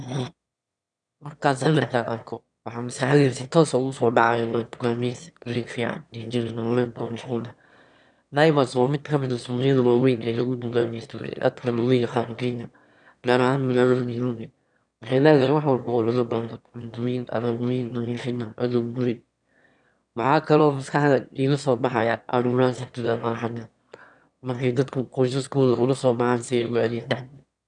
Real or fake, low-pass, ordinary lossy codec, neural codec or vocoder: fake; 9.9 kHz; none; autoencoder, 22.05 kHz, a latent of 192 numbers a frame, VITS, trained on one speaker